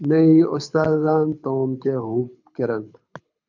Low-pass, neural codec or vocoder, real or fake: 7.2 kHz; codec, 24 kHz, 6 kbps, HILCodec; fake